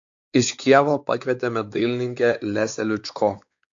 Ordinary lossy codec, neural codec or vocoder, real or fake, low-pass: AAC, 64 kbps; codec, 16 kHz, 4 kbps, X-Codec, WavLM features, trained on Multilingual LibriSpeech; fake; 7.2 kHz